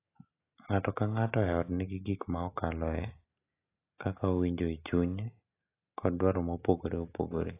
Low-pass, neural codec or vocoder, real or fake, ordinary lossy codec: 3.6 kHz; none; real; AAC, 24 kbps